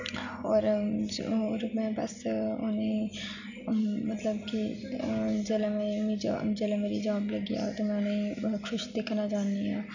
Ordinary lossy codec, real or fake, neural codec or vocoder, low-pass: none; real; none; 7.2 kHz